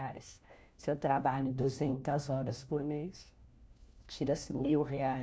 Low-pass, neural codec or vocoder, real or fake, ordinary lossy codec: none; codec, 16 kHz, 1 kbps, FunCodec, trained on LibriTTS, 50 frames a second; fake; none